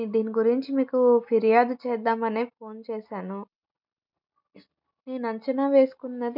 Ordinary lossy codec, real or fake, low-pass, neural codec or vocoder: none; real; 5.4 kHz; none